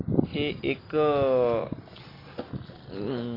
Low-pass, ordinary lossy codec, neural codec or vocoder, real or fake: 5.4 kHz; AAC, 24 kbps; none; real